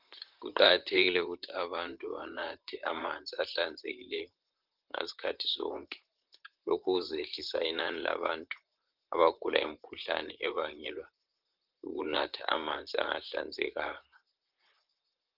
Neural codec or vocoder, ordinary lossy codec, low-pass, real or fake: vocoder, 44.1 kHz, 128 mel bands, Pupu-Vocoder; Opus, 24 kbps; 5.4 kHz; fake